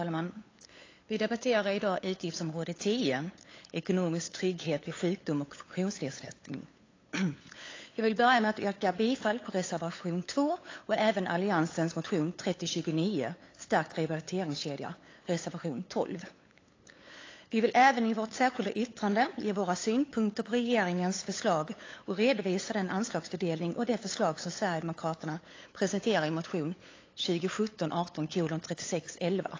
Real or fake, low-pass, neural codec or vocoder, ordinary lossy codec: fake; 7.2 kHz; codec, 16 kHz, 8 kbps, FunCodec, trained on LibriTTS, 25 frames a second; AAC, 32 kbps